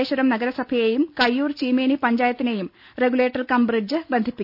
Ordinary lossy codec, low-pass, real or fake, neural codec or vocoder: none; 5.4 kHz; real; none